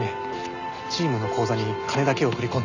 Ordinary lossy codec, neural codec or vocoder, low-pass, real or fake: none; none; 7.2 kHz; real